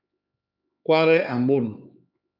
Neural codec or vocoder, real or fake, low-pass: codec, 16 kHz, 4 kbps, X-Codec, HuBERT features, trained on LibriSpeech; fake; 5.4 kHz